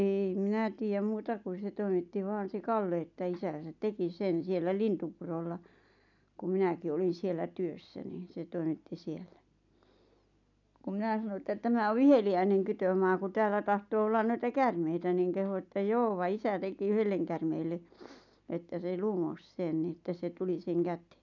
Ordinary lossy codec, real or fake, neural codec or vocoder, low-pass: none; real; none; 7.2 kHz